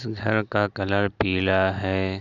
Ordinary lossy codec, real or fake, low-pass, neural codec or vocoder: none; real; 7.2 kHz; none